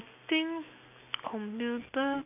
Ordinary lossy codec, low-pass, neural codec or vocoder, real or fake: none; 3.6 kHz; none; real